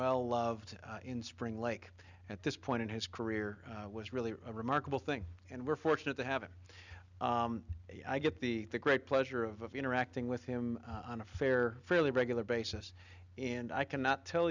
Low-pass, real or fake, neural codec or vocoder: 7.2 kHz; real; none